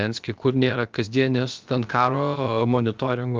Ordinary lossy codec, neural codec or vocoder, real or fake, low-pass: Opus, 24 kbps; codec, 16 kHz, about 1 kbps, DyCAST, with the encoder's durations; fake; 7.2 kHz